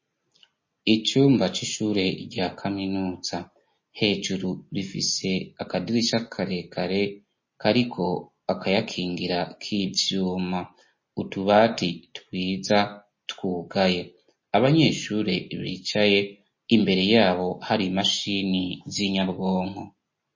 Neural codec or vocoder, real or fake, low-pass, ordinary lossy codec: none; real; 7.2 kHz; MP3, 32 kbps